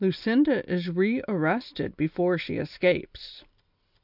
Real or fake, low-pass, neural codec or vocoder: real; 5.4 kHz; none